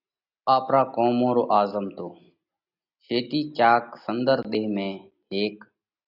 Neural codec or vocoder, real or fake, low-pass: none; real; 5.4 kHz